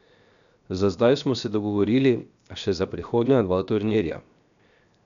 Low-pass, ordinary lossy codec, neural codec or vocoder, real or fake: 7.2 kHz; none; codec, 16 kHz, 0.7 kbps, FocalCodec; fake